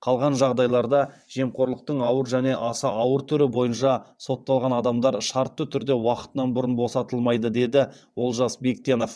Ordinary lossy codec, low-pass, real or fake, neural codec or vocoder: none; none; fake; vocoder, 22.05 kHz, 80 mel bands, WaveNeXt